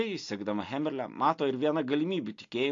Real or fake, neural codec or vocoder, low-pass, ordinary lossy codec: real; none; 7.2 kHz; AAC, 48 kbps